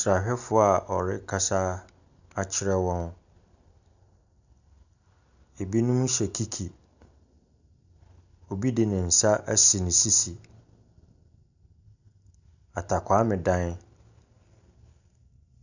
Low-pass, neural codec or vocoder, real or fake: 7.2 kHz; none; real